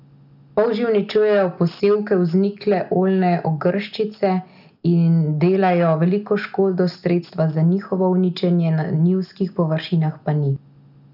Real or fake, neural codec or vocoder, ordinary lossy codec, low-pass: real; none; none; 5.4 kHz